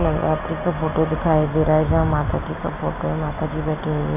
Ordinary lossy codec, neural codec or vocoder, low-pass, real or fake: none; none; 3.6 kHz; real